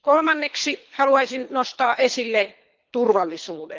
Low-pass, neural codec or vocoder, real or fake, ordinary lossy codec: 7.2 kHz; codec, 24 kHz, 3 kbps, HILCodec; fake; Opus, 24 kbps